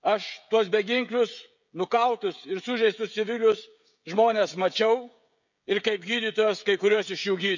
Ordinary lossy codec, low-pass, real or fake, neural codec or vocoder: none; 7.2 kHz; fake; codec, 16 kHz, 16 kbps, FreqCodec, smaller model